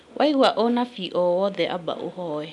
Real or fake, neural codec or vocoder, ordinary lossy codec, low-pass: real; none; MP3, 96 kbps; 10.8 kHz